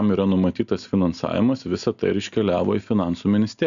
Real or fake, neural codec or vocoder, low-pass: real; none; 7.2 kHz